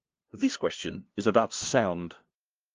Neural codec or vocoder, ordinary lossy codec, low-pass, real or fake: codec, 16 kHz, 0.5 kbps, FunCodec, trained on LibriTTS, 25 frames a second; Opus, 32 kbps; 7.2 kHz; fake